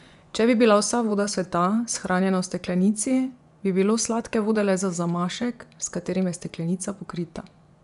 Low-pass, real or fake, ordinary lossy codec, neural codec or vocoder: 10.8 kHz; fake; none; vocoder, 24 kHz, 100 mel bands, Vocos